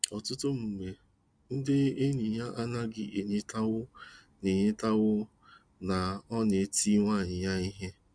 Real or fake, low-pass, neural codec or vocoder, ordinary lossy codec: real; 9.9 kHz; none; Opus, 64 kbps